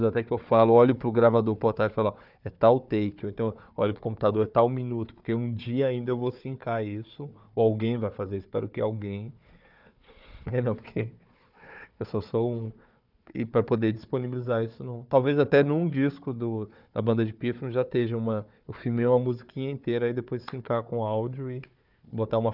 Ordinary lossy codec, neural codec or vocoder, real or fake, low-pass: none; codec, 16 kHz, 4 kbps, FunCodec, trained on Chinese and English, 50 frames a second; fake; 5.4 kHz